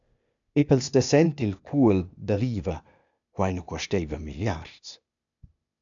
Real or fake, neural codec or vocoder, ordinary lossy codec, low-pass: fake; codec, 16 kHz, 0.8 kbps, ZipCodec; MP3, 96 kbps; 7.2 kHz